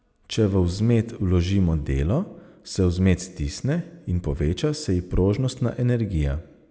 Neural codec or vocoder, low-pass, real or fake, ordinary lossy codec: none; none; real; none